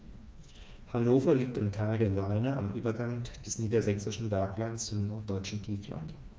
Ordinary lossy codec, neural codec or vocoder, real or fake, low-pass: none; codec, 16 kHz, 2 kbps, FreqCodec, smaller model; fake; none